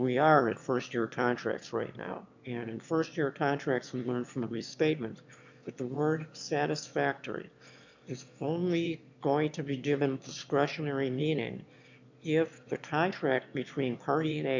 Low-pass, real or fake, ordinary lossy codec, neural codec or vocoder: 7.2 kHz; fake; MP3, 64 kbps; autoencoder, 22.05 kHz, a latent of 192 numbers a frame, VITS, trained on one speaker